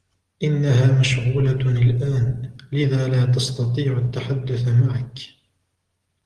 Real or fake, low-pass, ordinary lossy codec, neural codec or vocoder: real; 10.8 kHz; Opus, 16 kbps; none